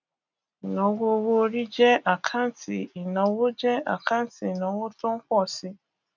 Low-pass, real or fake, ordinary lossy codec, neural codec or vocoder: 7.2 kHz; real; none; none